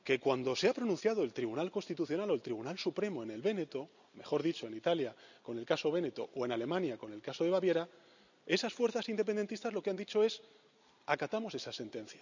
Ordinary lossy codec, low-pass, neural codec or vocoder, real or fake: none; 7.2 kHz; none; real